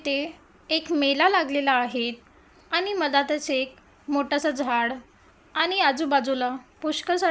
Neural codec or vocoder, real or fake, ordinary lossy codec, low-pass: none; real; none; none